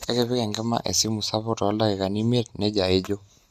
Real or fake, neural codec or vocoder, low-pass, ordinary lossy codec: real; none; 14.4 kHz; Opus, 64 kbps